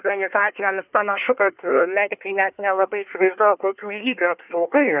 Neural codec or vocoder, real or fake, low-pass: codec, 24 kHz, 1 kbps, SNAC; fake; 3.6 kHz